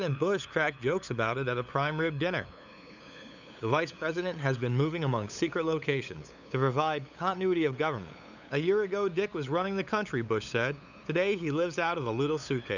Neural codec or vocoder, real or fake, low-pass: codec, 16 kHz, 8 kbps, FunCodec, trained on LibriTTS, 25 frames a second; fake; 7.2 kHz